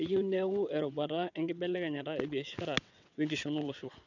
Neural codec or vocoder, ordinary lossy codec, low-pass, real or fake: vocoder, 22.05 kHz, 80 mel bands, WaveNeXt; none; 7.2 kHz; fake